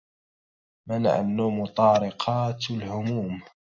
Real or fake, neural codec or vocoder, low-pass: real; none; 7.2 kHz